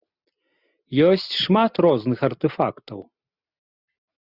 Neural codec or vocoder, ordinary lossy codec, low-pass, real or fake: none; Opus, 64 kbps; 5.4 kHz; real